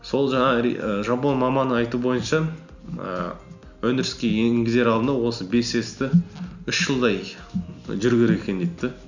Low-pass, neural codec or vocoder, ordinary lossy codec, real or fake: 7.2 kHz; none; none; real